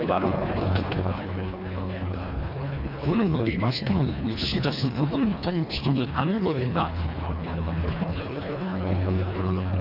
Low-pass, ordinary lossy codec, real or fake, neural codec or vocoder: 5.4 kHz; AAC, 48 kbps; fake; codec, 24 kHz, 1.5 kbps, HILCodec